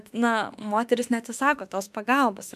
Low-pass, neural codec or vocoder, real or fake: 14.4 kHz; autoencoder, 48 kHz, 32 numbers a frame, DAC-VAE, trained on Japanese speech; fake